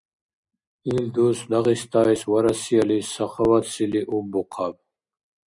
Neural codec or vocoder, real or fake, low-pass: none; real; 10.8 kHz